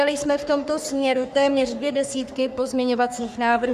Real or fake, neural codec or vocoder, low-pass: fake; codec, 44.1 kHz, 3.4 kbps, Pupu-Codec; 14.4 kHz